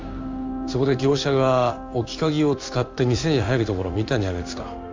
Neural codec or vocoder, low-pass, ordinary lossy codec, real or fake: codec, 16 kHz in and 24 kHz out, 1 kbps, XY-Tokenizer; 7.2 kHz; MP3, 64 kbps; fake